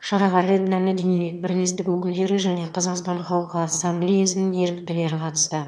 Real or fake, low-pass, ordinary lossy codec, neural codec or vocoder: fake; 9.9 kHz; none; autoencoder, 22.05 kHz, a latent of 192 numbers a frame, VITS, trained on one speaker